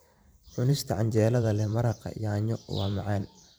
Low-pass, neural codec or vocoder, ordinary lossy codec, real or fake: none; vocoder, 44.1 kHz, 128 mel bands every 512 samples, BigVGAN v2; none; fake